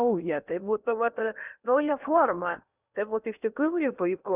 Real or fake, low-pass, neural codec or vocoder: fake; 3.6 kHz; codec, 16 kHz in and 24 kHz out, 0.8 kbps, FocalCodec, streaming, 65536 codes